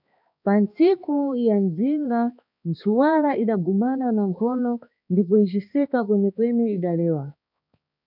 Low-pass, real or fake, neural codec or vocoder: 5.4 kHz; fake; codec, 16 kHz, 2 kbps, X-Codec, HuBERT features, trained on balanced general audio